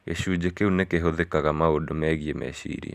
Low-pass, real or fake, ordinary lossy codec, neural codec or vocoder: 14.4 kHz; real; none; none